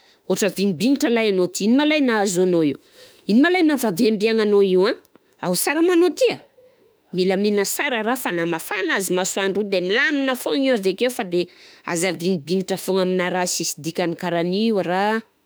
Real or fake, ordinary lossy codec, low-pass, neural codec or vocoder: fake; none; none; autoencoder, 48 kHz, 32 numbers a frame, DAC-VAE, trained on Japanese speech